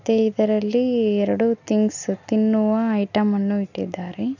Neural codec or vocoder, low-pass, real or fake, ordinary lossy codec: none; 7.2 kHz; real; none